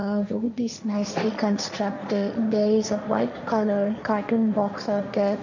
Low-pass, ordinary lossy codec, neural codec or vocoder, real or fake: 7.2 kHz; none; codec, 16 kHz, 1.1 kbps, Voila-Tokenizer; fake